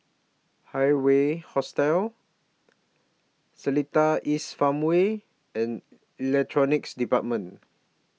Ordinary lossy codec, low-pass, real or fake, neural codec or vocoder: none; none; real; none